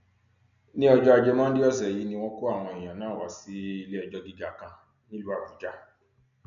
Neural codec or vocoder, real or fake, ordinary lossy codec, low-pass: none; real; none; 7.2 kHz